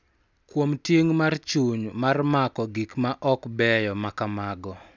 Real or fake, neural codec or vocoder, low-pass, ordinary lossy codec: real; none; 7.2 kHz; none